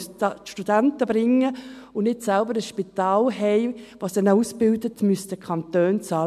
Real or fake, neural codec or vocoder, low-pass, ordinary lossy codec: real; none; 14.4 kHz; MP3, 96 kbps